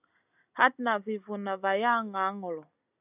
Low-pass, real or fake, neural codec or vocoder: 3.6 kHz; real; none